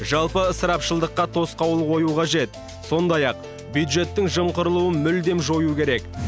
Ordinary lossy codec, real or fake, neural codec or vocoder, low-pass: none; real; none; none